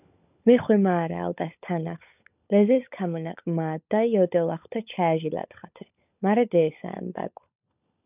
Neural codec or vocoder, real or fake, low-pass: codec, 16 kHz, 16 kbps, FunCodec, trained on LibriTTS, 50 frames a second; fake; 3.6 kHz